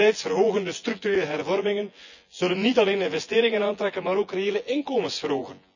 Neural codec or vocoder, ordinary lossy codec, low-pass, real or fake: vocoder, 24 kHz, 100 mel bands, Vocos; none; 7.2 kHz; fake